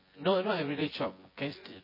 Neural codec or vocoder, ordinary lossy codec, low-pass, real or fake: vocoder, 24 kHz, 100 mel bands, Vocos; MP3, 24 kbps; 5.4 kHz; fake